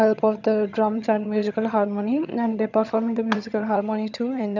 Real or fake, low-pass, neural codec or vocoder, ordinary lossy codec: fake; 7.2 kHz; vocoder, 22.05 kHz, 80 mel bands, HiFi-GAN; none